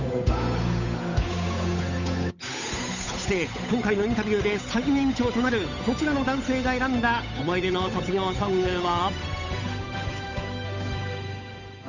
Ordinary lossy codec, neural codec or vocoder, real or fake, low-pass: none; codec, 16 kHz, 8 kbps, FunCodec, trained on Chinese and English, 25 frames a second; fake; 7.2 kHz